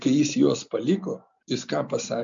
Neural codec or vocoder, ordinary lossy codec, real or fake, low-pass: none; MP3, 64 kbps; real; 7.2 kHz